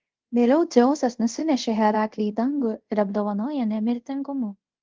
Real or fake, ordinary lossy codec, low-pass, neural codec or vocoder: fake; Opus, 16 kbps; 7.2 kHz; codec, 24 kHz, 0.5 kbps, DualCodec